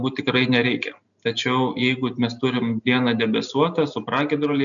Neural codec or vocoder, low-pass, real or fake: none; 7.2 kHz; real